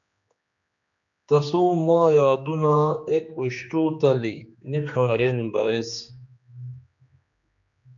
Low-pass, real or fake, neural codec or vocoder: 7.2 kHz; fake; codec, 16 kHz, 2 kbps, X-Codec, HuBERT features, trained on general audio